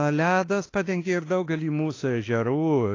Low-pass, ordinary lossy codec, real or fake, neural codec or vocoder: 7.2 kHz; AAC, 32 kbps; fake; autoencoder, 48 kHz, 32 numbers a frame, DAC-VAE, trained on Japanese speech